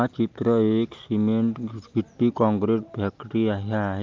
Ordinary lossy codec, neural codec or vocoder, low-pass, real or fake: Opus, 32 kbps; none; 7.2 kHz; real